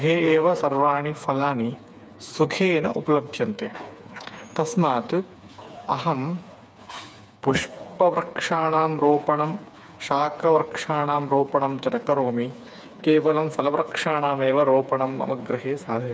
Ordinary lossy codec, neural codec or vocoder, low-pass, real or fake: none; codec, 16 kHz, 4 kbps, FreqCodec, smaller model; none; fake